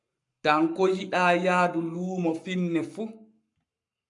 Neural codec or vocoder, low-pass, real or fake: codec, 44.1 kHz, 7.8 kbps, Pupu-Codec; 10.8 kHz; fake